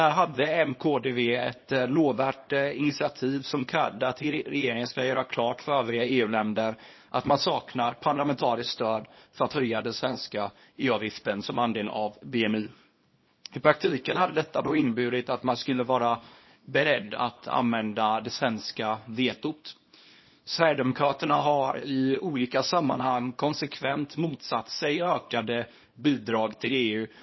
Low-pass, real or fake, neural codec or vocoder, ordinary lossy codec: 7.2 kHz; fake; codec, 24 kHz, 0.9 kbps, WavTokenizer, small release; MP3, 24 kbps